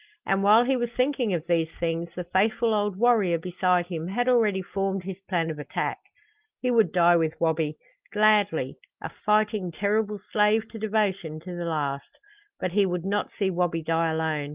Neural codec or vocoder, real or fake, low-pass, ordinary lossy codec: none; real; 3.6 kHz; Opus, 32 kbps